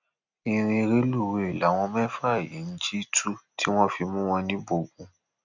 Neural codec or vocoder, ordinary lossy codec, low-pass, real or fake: none; none; 7.2 kHz; real